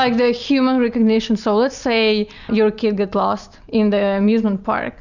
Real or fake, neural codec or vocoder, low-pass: real; none; 7.2 kHz